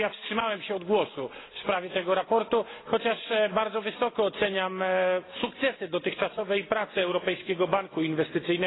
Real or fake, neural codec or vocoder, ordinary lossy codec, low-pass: real; none; AAC, 16 kbps; 7.2 kHz